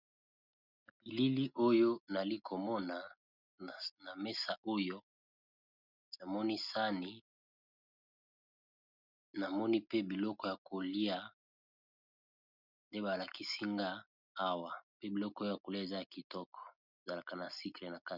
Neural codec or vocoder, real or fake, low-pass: none; real; 5.4 kHz